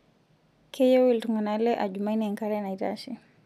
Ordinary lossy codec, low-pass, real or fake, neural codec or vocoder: none; 14.4 kHz; real; none